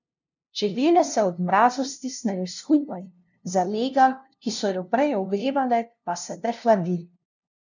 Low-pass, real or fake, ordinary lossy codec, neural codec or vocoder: 7.2 kHz; fake; none; codec, 16 kHz, 0.5 kbps, FunCodec, trained on LibriTTS, 25 frames a second